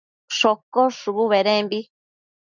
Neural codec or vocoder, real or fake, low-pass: none; real; 7.2 kHz